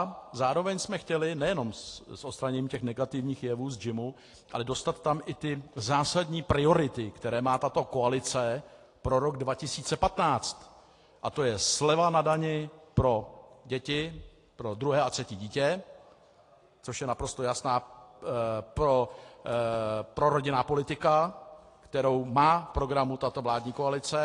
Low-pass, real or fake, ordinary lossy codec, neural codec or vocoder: 10.8 kHz; real; AAC, 48 kbps; none